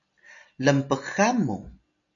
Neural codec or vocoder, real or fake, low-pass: none; real; 7.2 kHz